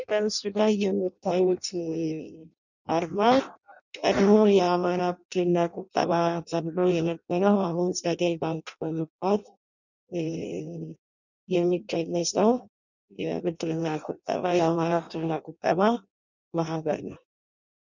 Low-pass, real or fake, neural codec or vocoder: 7.2 kHz; fake; codec, 16 kHz in and 24 kHz out, 0.6 kbps, FireRedTTS-2 codec